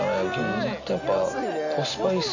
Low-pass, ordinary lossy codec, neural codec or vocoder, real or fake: 7.2 kHz; none; none; real